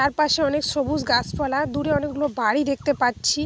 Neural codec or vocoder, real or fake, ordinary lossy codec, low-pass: none; real; none; none